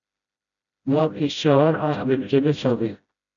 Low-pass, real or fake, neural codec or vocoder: 7.2 kHz; fake; codec, 16 kHz, 0.5 kbps, FreqCodec, smaller model